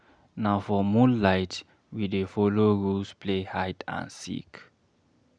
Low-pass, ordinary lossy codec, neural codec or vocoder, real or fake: 9.9 kHz; none; none; real